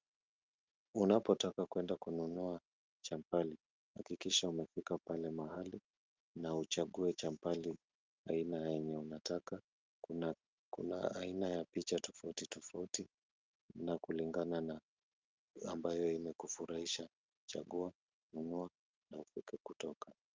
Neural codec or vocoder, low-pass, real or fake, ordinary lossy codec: none; 7.2 kHz; real; Opus, 32 kbps